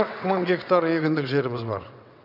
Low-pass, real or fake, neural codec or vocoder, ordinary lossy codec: 5.4 kHz; fake; vocoder, 22.05 kHz, 80 mel bands, WaveNeXt; none